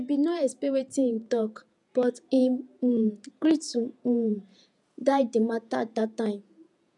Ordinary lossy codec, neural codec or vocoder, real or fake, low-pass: none; vocoder, 48 kHz, 128 mel bands, Vocos; fake; 10.8 kHz